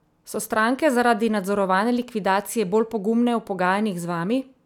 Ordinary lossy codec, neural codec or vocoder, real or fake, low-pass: none; none; real; 19.8 kHz